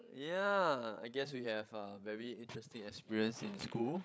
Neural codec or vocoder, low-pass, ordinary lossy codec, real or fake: codec, 16 kHz, 16 kbps, FreqCodec, larger model; none; none; fake